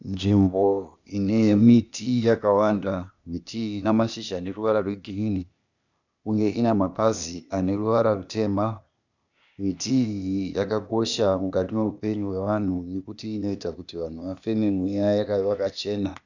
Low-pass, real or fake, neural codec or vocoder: 7.2 kHz; fake; codec, 16 kHz, 0.8 kbps, ZipCodec